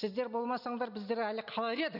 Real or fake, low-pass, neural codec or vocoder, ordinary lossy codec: real; 5.4 kHz; none; none